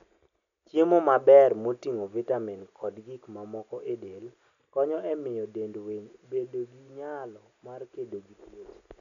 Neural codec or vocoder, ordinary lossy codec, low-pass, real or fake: none; none; 7.2 kHz; real